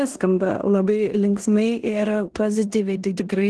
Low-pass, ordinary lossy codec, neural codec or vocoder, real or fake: 10.8 kHz; Opus, 16 kbps; codec, 16 kHz in and 24 kHz out, 0.9 kbps, LongCat-Audio-Codec, four codebook decoder; fake